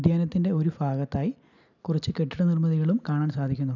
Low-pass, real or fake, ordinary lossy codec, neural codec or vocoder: 7.2 kHz; real; none; none